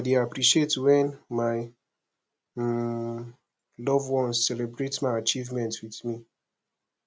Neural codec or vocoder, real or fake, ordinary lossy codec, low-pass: none; real; none; none